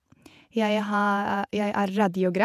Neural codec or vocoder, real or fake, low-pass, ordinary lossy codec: vocoder, 48 kHz, 128 mel bands, Vocos; fake; 14.4 kHz; none